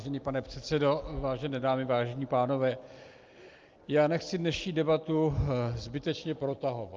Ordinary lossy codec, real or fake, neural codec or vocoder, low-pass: Opus, 32 kbps; real; none; 7.2 kHz